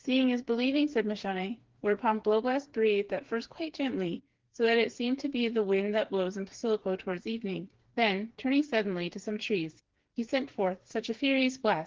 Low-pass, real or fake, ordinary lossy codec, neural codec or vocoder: 7.2 kHz; fake; Opus, 16 kbps; codec, 16 kHz, 4 kbps, FreqCodec, smaller model